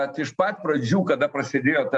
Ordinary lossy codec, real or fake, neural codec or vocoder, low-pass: MP3, 96 kbps; real; none; 10.8 kHz